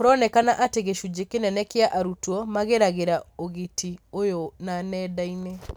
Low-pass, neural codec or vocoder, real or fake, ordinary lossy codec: none; none; real; none